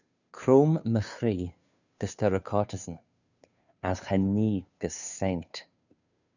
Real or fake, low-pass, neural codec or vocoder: fake; 7.2 kHz; codec, 16 kHz, 2 kbps, FunCodec, trained on Chinese and English, 25 frames a second